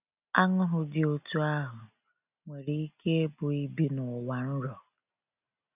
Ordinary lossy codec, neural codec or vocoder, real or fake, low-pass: none; none; real; 3.6 kHz